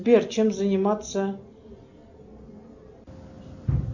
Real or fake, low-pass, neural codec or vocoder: real; 7.2 kHz; none